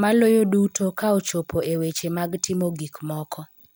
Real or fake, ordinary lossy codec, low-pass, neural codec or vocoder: real; none; none; none